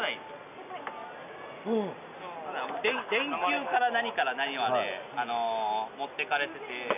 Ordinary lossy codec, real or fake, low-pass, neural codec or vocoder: none; real; 3.6 kHz; none